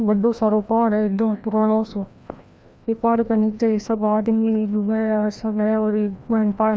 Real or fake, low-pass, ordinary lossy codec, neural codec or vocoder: fake; none; none; codec, 16 kHz, 1 kbps, FreqCodec, larger model